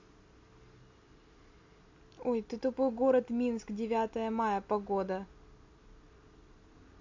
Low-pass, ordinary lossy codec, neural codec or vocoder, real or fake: 7.2 kHz; MP3, 48 kbps; none; real